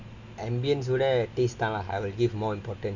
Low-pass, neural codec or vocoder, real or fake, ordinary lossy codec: 7.2 kHz; none; real; none